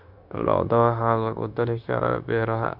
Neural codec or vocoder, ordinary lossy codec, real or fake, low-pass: codec, 16 kHz, 0.9 kbps, LongCat-Audio-Codec; none; fake; 5.4 kHz